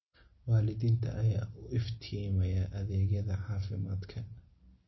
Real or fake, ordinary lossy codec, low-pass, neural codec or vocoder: real; MP3, 24 kbps; 7.2 kHz; none